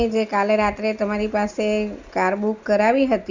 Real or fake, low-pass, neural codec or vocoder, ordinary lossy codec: real; 7.2 kHz; none; Opus, 64 kbps